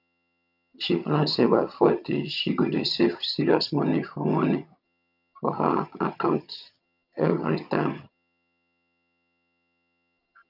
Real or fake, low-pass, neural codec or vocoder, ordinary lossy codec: fake; 5.4 kHz; vocoder, 22.05 kHz, 80 mel bands, HiFi-GAN; none